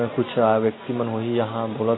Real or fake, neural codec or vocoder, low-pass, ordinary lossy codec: real; none; 7.2 kHz; AAC, 16 kbps